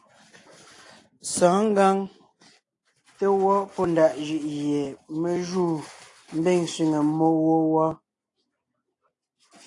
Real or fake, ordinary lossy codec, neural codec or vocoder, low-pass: real; AAC, 48 kbps; none; 10.8 kHz